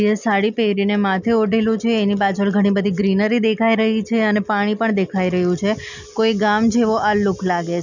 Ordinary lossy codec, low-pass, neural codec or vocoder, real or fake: none; 7.2 kHz; none; real